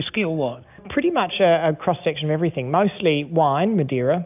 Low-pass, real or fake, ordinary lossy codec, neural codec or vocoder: 3.6 kHz; real; AAC, 32 kbps; none